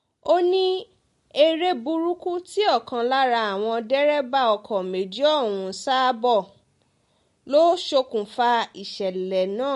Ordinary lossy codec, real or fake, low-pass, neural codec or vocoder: MP3, 48 kbps; real; 14.4 kHz; none